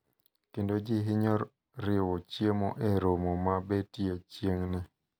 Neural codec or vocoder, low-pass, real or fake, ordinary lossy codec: none; none; real; none